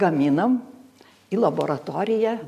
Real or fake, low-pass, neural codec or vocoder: real; 14.4 kHz; none